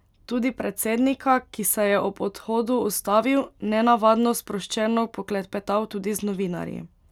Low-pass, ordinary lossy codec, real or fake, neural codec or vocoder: 19.8 kHz; none; real; none